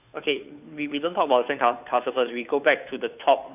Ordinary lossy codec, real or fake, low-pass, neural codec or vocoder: none; fake; 3.6 kHz; codec, 16 kHz, 2 kbps, FunCodec, trained on Chinese and English, 25 frames a second